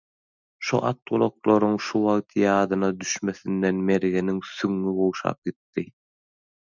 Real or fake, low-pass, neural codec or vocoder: real; 7.2 kHz; none